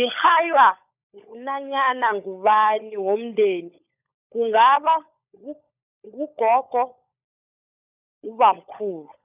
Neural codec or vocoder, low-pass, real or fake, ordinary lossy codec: codec, 16 kHz, 16 kbps, FunCodec, trained on LibriTTS, 50 frames a second; 3.6 kHz; fake; none